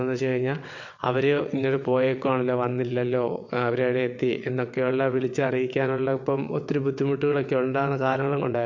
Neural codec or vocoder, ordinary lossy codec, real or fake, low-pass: vocoder, 22.05 kHz, 80 mel bands, WaveNeXt; MP3, 48 kbps; fake; 7.2 kHz